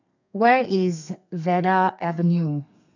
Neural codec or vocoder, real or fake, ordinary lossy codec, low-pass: codec, 32 kHz, 1.9 kbps, SNAC; fake; none; 7.2 kHz